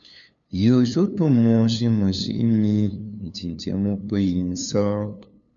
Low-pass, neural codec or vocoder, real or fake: 7.2 kHz; codec, 16 kHz, 2 kbps, FunCodec, trained on LibriTTS, 25 frames a second; fake